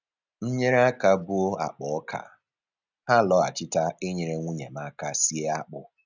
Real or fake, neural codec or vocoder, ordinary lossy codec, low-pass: real; none; none; 7.2 kHz